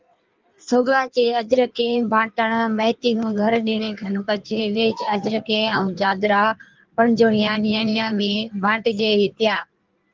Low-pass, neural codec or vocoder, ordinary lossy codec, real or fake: 7.2 kHz; codec, 16 kHz in and 24 kHz out, 1.1 kbps, FireRedTTS-2 codec; Opus, 32 kbps; fake